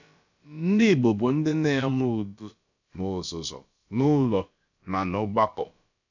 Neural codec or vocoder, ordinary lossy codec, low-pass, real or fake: codec, 16 kHz, about 1 kbps, DyCAST, with the encoder's durations; none; 7.2 kHz; fake